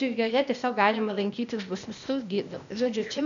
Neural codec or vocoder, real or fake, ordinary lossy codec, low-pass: codec, 16 kHz, 0.8 kbps, ZipCodec; fake; MP3, 96 kbps; 7.2 kHz